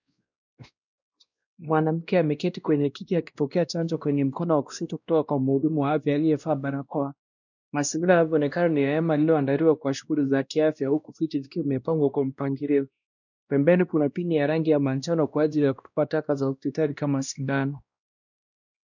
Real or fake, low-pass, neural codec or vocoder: fake; 7.2 kHz; codec, 16 kHz, 1 kbps, X-Codec, WavLM features, trained on Multilingual LibriSpeech